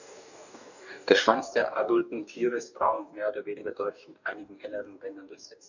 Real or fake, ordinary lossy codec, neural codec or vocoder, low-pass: fake; none; codec, 44.1 kHz, 2.6 kbps, DAC; 7.2 kHz